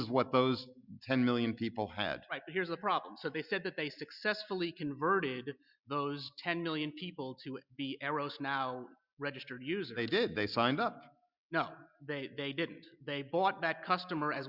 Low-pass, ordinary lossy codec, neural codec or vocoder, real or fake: 5.4 kHz; Opus, 64 kbps; none; real